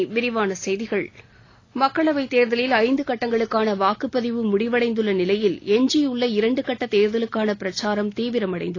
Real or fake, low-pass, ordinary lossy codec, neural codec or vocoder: real; 7.2 kHz; AAC, 32 kbps; none